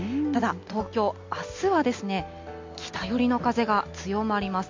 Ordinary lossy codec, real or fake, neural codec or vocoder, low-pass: MP3, 48 kbps; real; none; 7.2 kHz